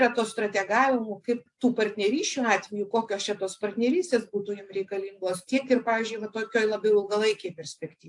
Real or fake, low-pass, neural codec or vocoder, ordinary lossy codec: real; 10.8 kHz; none; AAC, 64 kbps